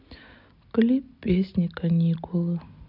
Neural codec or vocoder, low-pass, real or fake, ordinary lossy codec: none; 5.4 kHz; real; none